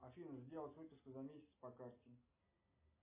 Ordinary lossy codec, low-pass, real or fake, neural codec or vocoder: MP3, 24 kbps; 3.6 kHz; real; none